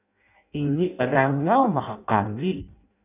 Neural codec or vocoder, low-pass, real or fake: codec, 16 kHz in and 24 kHz out, 0.6 kbps, FireRedTTS-2 codec; 3.6 kHz; fake